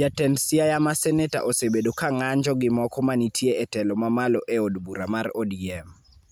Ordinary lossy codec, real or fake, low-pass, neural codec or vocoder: none; real; none; none